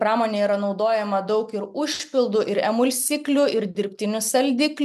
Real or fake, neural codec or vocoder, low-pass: real; none; 14.4 kHz